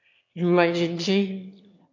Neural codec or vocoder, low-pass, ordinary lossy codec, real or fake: autoencoder, 22.05 kHz, a latent of 192 numbers a frame, VITS, trained on one speaker; 7.2 kHz; MP3, 48 kbps; fake